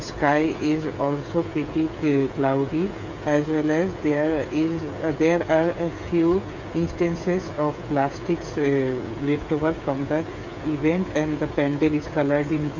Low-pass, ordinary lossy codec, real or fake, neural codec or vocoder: 7.2 kHz; none; fake; codec, 16 kHz, 8 kbps, FreqCodec, smaller model